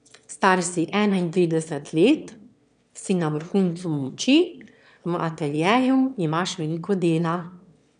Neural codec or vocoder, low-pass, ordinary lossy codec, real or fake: autoencoder, 22.05 kHz, a latent of 192 numbers a frame, VITS, trained on one speaker; 9.9 kHz; none; fake